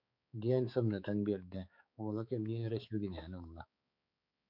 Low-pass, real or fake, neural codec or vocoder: 5.4 kHz; fake; codec, 16 kHz, 4 kbps, X-Codec, HuBERT features, trained on balanced general audio